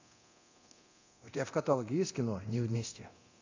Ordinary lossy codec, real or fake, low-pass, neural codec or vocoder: AAC, 48 kbps; fake; 7.2 kHz; codec, 24 kHz, 0.9 kbps, DualCodec